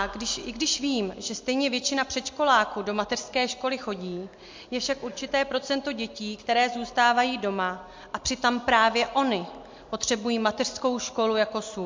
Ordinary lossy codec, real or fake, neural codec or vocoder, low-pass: MP3, 48 kbps; real; none; 7.2 kHz